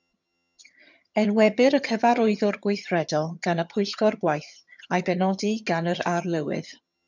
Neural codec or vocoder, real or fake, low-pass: vocoder, 22.05 kHz, 80 mel bands, HiFi-GAN; fake; 7.2 kHz